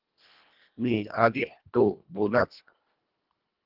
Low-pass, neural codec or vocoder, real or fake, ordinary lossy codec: 5.4 kHz; codec, 24 kHz, 1.5 kbps, HILCodec; fake; Opus, 32 kbps